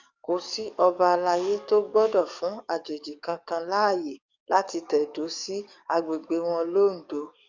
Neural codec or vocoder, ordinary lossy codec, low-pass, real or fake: codec, 44.1 kHz, 7.8 kbps, DAC; Opus, 64 kbps; 7.2 kHz; fake